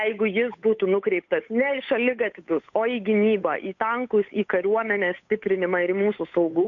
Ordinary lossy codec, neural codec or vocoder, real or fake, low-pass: AAC, 48 kbps; codec, 16 kHz, 8 kbps, FunCodec, trained on Chinese and English, 25 frames a second; fake; 7.2 kHz